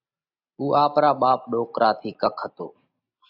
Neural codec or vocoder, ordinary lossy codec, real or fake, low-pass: none; AAC, 48 kbps; real; 5.4 kHz